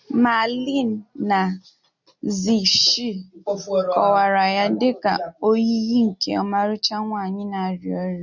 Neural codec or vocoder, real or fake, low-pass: none; real; 7.2 kHz